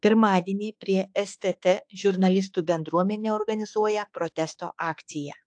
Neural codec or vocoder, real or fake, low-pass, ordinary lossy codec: autoencoder, 48 kHz, 32 numbers a frame, DAC-VAE, trained on Japanese speech; fake; 9.9 kHz; AAC, 64 kbps